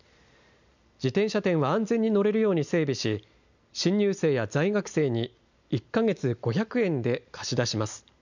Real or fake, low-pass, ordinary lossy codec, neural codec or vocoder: real; 7.2 kHz; none; none